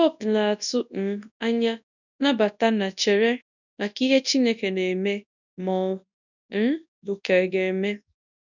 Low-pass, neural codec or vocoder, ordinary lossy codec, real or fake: 7.2 kHz; codec, 24 kHz, 0.9 kbps, WavTokenizer, large speech release; none; fake